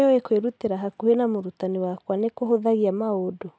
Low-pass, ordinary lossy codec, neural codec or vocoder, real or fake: none; none; none; real